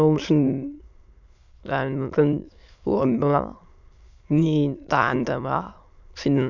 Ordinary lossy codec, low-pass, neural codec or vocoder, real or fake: none; 7.2 kHz; autoencoder, 22.05 kHz, a latent of 192 numbers a frame, VITS, trained on many speakers; fake